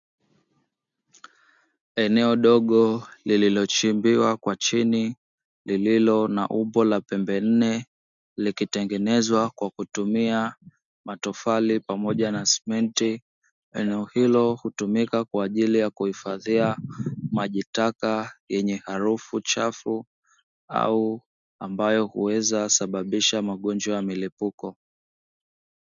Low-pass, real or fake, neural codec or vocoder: 7.2 kHz; real; none